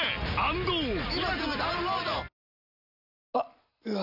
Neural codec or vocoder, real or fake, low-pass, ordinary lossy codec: none; real; 5.4 kHz; none